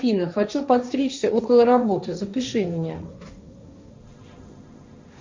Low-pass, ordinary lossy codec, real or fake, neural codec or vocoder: 7.2 kHz; MP3, 64 kbps; fake; codec, 16 kHz, 1.1 kbps, Voila-Tokenizer